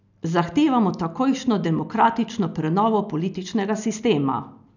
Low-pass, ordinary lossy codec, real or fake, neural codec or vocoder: 7.2 kHz; none; real; none